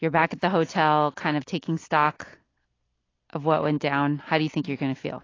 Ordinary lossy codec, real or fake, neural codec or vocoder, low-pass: AAC, 32 kbps; real; none; 7.2 kHz